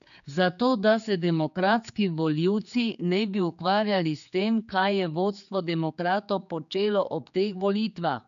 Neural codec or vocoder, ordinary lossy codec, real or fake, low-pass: codec, 16 kHz, 4 kbps, X-Codec, HuBERT features, trained on general audio; none; fake; 7.2 kHz